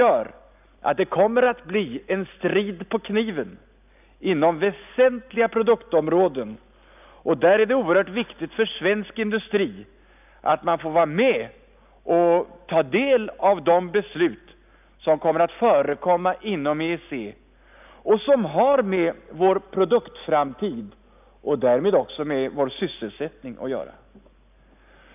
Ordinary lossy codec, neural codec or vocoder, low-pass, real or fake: none; none; 3.6 kHz; real